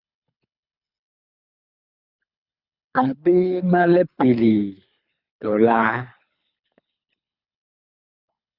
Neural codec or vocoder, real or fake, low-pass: codec, 24 kHz, 3 kbps, HILCodec; fake; 5.4 kHz